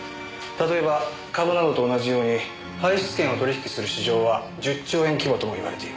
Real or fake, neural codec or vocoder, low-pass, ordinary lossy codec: real; none; none; none